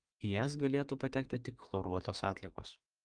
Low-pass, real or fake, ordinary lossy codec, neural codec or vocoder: 9.9 kHz; fake; Opus, 32 kbps; codec, 32 kHz, 1.9 kbps, SNAC